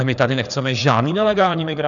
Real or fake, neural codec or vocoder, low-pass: fake; codec, 16 kHz, 4 kbps, FreqCodec, larger model; 7.2 kHz